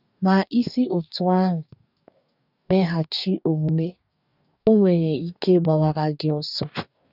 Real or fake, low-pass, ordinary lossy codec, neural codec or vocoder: fake; 5.4 kHz; none; codec, 44.1 kHz, 2.6 kbps, DAC